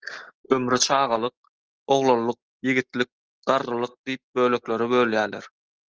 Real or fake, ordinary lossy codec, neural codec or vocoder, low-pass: real; Opus, 16 kbps; none; 7.2 kHz